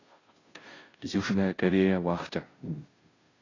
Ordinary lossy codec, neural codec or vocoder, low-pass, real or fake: AAC, 32 kbps; codec, 16 kHz, 0.5 kbps, FunCodec, trained on Chinese and English, 25 frames a second; 7.2 kHz; fake